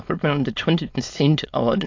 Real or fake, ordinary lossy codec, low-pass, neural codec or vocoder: fake; AAC, 48 kbps; 7.2 kHz; autoencoder, 22.05 kHz, a latent of 192 numbers a frame, VITS, trained on many speakers